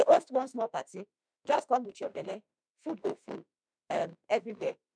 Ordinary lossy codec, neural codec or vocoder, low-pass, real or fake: none; autoencoder, 48 kHz, 32 numbers a frame, DAC-VAE, trained on Japanese speech; 9.9 kHz; fake